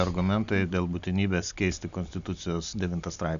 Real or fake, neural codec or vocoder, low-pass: real; none; 7.2 kHz